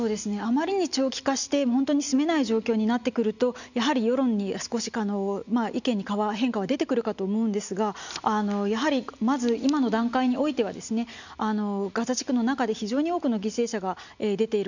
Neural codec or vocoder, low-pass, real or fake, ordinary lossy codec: none; 7.2 kHz; real; none